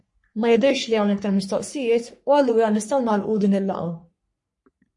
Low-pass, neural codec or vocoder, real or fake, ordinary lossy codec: 10.8 kHz; codec, 44.1 kHz, 3.4 kbps, Pupu-Codec; fake; MP3, 48 kbps